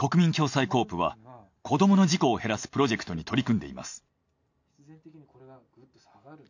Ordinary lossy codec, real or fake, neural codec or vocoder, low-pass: none; real; none; 7.2 kHz